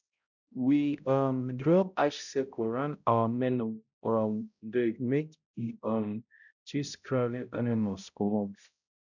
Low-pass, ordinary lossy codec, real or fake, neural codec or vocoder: 7.2 kHz; none; fake; codec, 16 kHz, 0.5 kbps, X-Codec, HuBERT features, trained on balanced general audio